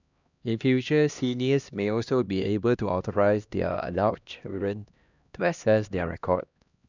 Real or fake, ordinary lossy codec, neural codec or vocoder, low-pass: fake; none; codec, 16 kHz, 1 kbps, X-Codec, HuBERT features, trained on LibriSpeech; 7.2 kHz